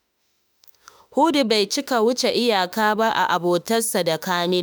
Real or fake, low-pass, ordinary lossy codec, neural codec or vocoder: fake; none; none; autoencoder, 48 kHz, 32 numbers a frame, DAC-VAE, trained on Japanese speech